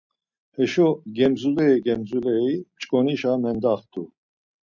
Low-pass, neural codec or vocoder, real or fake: 7.2 kHz; none; real